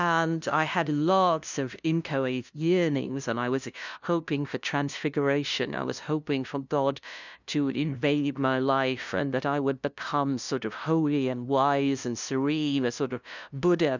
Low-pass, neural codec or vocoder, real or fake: 7.2 kHz; codec, 16 kHz, 0.5 kbps, FunCodec, trained on LibriTTS, 25 frames a second; fake